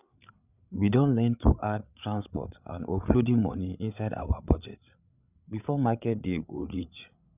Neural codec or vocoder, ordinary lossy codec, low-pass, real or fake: codec, 16 kHz, 8 kbps, FreqCodec, larger model; none; 3.6 kHz; fake